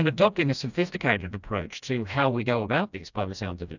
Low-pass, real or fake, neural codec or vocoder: 7.2 kHz; fake; codec, 16 kHz, 1 kbps, FreqCodec, smaller model